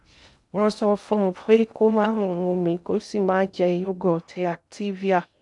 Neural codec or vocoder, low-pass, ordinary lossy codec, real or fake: codec, 16 kHz in and 24 kHz out, 0.6 kbps, FocalCodec, streaming, 2048 codes; 10.8 kHz; none; fake